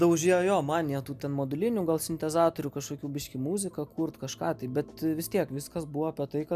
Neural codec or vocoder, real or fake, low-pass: none; real; 14.4 kHz